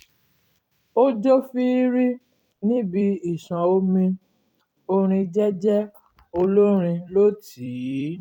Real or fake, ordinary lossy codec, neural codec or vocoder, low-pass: fake; none; vocoder, 44.1 kHz, 128 mel bands every 256 samples, BigVGAN v2; 19.8 kHz